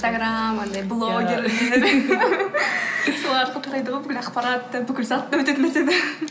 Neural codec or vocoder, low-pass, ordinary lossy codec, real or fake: none; none; none; real